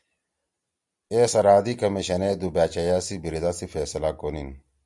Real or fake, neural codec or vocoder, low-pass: real; none; 10.8 kHz